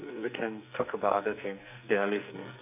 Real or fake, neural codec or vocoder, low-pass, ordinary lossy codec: fake; codec, 44.1 kHz, 2.6 kbps, SNAC; 3.6 kHz; none